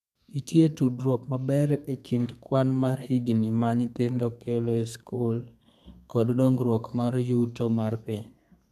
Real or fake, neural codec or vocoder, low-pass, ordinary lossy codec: fake; codec, 32 kHz, 1.9 kbps, SNAC; 14.4 kHz; none